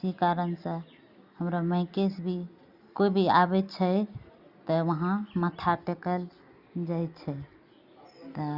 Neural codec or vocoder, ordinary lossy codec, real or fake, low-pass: none; Opus, 64 kbps; real; 5.4 kHz